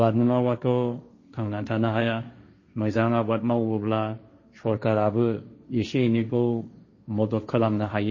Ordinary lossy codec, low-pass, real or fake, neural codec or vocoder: MP3, 32 kbps; 7.2 kHz; fake; codec, 16 kHz, 1.1 kbps, Voila-Tokenizer